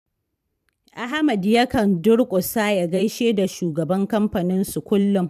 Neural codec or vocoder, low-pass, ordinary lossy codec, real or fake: vocoder, 44.1 kHz, 128 mel bands every 512 samples, BigVGAN v2; 14.4 kHz; none; fake